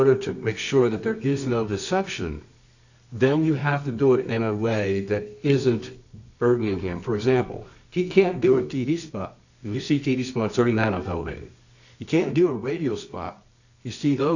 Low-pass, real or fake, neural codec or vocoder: 7.2 kHz; fake; codec, 24 kHz, 0.9 kbps, WavTokenizer, medium music audio release